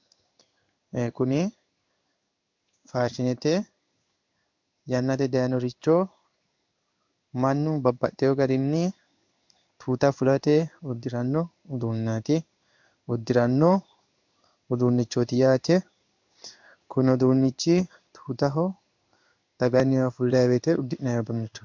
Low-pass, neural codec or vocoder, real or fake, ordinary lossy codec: 7.2 kHz; codec, 16 kHz in and 24 kHz out, 1 kbps, XY-Tokenizer; fake; MP3, 64 kbps